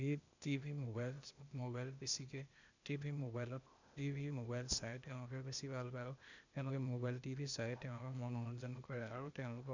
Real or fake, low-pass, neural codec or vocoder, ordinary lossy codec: fake; 7.2 kHz; codec, 16 kHz, 0.8 kbps, ZipCodec; none